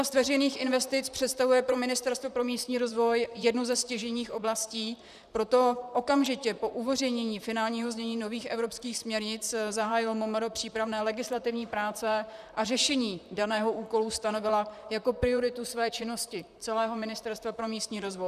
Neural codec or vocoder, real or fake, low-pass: vocoder, 44.1 kHz, 128 mel bands, Pupu-Vocoder; fake; 14.4 kHz